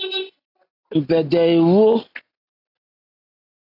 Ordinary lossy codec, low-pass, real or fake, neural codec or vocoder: AAC, 32 kbps; 5.4 kHz; real; none